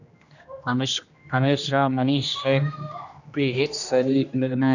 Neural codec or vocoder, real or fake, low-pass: codec, 16 kHz, 1 kbps, X-Codec, HuBERT features, trained on general audio; fake; 7.2 kHz